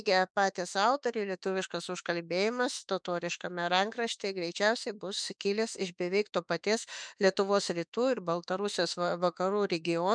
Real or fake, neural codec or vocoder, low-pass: fake; autoencoder, 48 kHz, 32 numbers a frame, DAC-VAE, trained on Japanese speech; 9.9 kHz